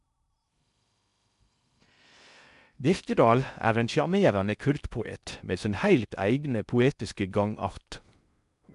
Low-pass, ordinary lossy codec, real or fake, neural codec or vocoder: 10.8 kHz; none; fake; codec, 16 kHz in and 24 kHz out, 0.6 kbps, FocalCodec, streaming, 2048 codes